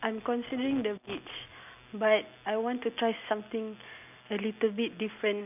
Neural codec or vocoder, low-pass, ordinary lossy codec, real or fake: none; 3.6 kHz; none; real